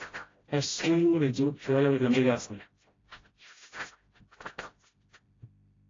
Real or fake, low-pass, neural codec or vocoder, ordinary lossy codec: fake; 7.2 kHz; codec, 16 kHz, 0.5 kbps, FreqCodec, smaller model; AAC, 32 kbps